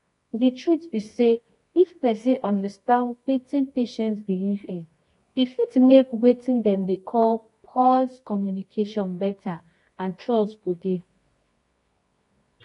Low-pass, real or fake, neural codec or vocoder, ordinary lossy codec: 10.8 kHz; fake; codec, 24 kHz, 0.9 kbps, WavTokenizer, medium music audio release; AAC, 48 kbps